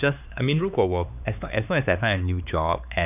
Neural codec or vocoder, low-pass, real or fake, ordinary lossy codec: codec, 16 kHz, 4 kbps, X-Codec, HuBERT features, trained on LibriSpeech; 3.6 kHz; fake; none